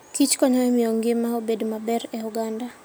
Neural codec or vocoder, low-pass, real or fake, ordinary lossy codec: none; none; real; none